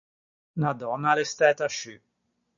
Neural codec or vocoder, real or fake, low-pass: none; real; 7.2 kHz